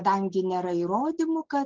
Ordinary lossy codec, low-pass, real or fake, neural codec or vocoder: Opus, 16 kbps; 7.2 kHz; real; none